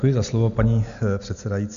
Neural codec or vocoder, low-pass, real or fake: none; 7.2 kHz; real